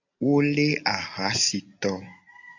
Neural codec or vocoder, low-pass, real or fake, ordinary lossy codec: none; 7.2 kHz; real; AAC, 48 kbps